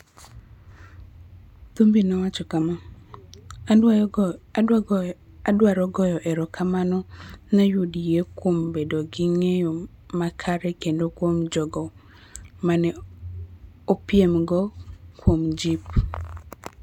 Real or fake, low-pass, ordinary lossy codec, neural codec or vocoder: real; 19.8 kHz; none; none